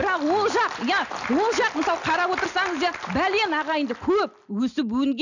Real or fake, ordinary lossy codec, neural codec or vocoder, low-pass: real; none; none; 7.2 kHz